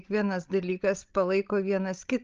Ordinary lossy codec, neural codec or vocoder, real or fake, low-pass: Opus, 24 kbps; codec, 16 kHz, 16 kbps, FreqCodec, larger model; fake; 7.2 kHz